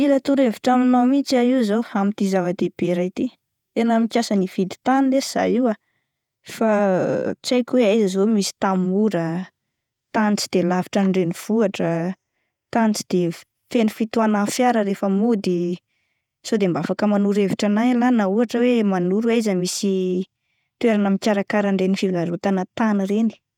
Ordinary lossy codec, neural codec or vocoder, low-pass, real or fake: none; vocoder, 48 kHz, 128 mel bands, Vocos; 19.8 kHz; fake